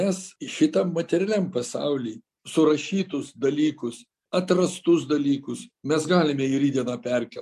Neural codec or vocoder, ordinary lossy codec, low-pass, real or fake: none; MP3, 64 kbps; 14.4 kHz; real